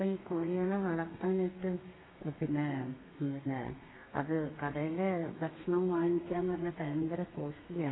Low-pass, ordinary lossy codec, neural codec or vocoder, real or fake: 7.2 kHz; AAC, 16 kbps; codec, 32 kHz, 1.9 kbps, SNAC; fake